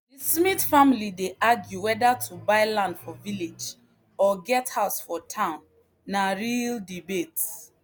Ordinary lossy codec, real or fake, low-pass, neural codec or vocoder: none; real; none; none